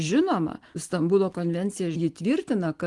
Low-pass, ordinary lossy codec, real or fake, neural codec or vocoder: 10.8 kHz; Opus, 24 kbps; real; none